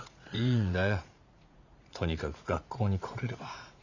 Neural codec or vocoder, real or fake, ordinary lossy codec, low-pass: vocoder, 44.1 kHz, 80 mel bands, Vocos; fake; none; 7.2 kHz